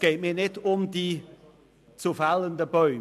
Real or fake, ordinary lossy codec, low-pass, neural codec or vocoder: real; AAC, 96 kbps; 14.4 kHz; none